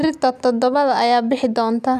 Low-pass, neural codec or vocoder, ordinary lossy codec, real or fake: 14.4 kHz; none; none; real